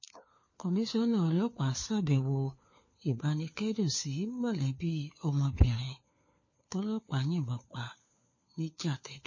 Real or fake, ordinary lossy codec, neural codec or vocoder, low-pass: fake; MP3, 32 kbps; codec, 16 kHz, 4 kbps, FunCodec, trained on LibriTTS, 50 frames a second; 7.2 kHz